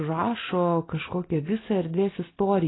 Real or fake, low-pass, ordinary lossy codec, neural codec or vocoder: real; 7.2 kHz; AAC, 16 kbps; none